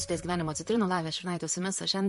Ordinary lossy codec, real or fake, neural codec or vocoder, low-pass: MP3, 48 kbps; fake; vocoder, 44.1 kHz, 128 mel bands, Pupu-Vocoder; 14.4 kHz